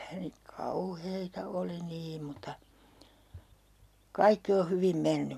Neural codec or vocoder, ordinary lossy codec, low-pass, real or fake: none; MP3, 96 kbps; 14.4 kHz; real